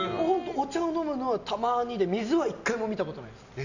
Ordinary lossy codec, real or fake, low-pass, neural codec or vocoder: none; real; 7.2 kHz; none